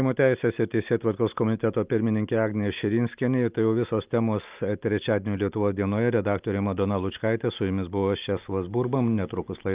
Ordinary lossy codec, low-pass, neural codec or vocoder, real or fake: Opus, 32 kbps; 3.6 kHz; none; real